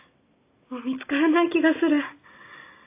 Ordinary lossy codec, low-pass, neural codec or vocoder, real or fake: AAC, 32 kbps; 3.6 kHz; none; real